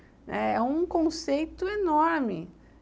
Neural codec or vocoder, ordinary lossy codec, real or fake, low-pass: none; none; real; none